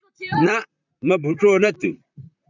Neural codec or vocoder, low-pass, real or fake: vocoder, 44.1 kHz, 128 mel bands, Pupu-Vocoder; 7.2 kHz; fake